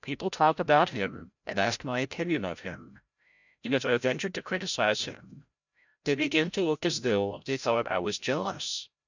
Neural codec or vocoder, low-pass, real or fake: codec, 16 kHz, 0.5 kbps, FreqCodec, larger model; 7.2 kHz; fake